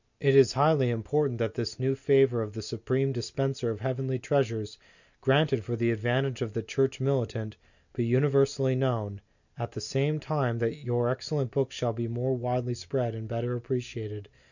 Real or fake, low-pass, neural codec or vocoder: real; 7.2 kHz; none